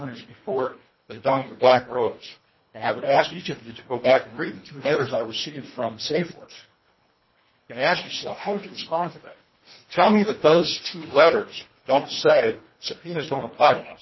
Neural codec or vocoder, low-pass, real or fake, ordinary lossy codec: codec, 24 kHz, 1.5 kbps, HILCodec; 7.2 kHz; fake; MP3, 24 kbps